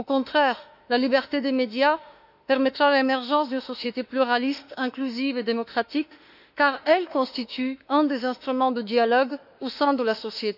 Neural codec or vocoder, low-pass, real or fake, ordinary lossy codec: autoencoder, 48 kHz, 32 numbers a frame, DAC-VAE, trained on Japanese speech; 5.4 kHz; fake; none